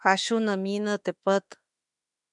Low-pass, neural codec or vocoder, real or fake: 10.8 kHz; autoencoder, 48 kHz, 32 numbers a frame, DAC-VAE, trained on Japanese speech; fake